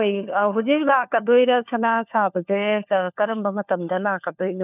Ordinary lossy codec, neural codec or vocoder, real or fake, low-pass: none; codec, 16 kHz, 4 kbps, FunCodec, trained on LibriTTS, 50 frames a second; fake; 3.6 kHz